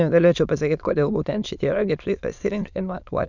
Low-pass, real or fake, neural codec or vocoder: 7.2 kHz; fake; autoencoder, 22.05 kHz, a latent of 192 numbers a frame, VITS, trained on many speakers